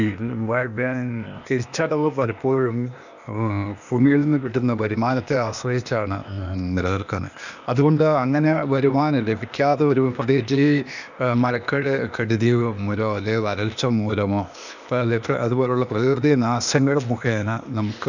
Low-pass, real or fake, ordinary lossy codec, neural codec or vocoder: 7.2 kHz; fake; none; codec, 16 kHz, 0.8 kbps, ZipCodec